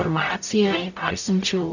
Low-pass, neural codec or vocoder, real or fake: 7.2 kHz; codec, 44.1 kHz, 0.9 kbps, DAC; fake